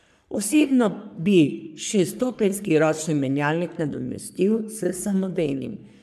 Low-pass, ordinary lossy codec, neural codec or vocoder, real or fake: 14.4 kHz; none; codec, 44.1 kHz, 3.4 kbps, Pupu-Codec; fake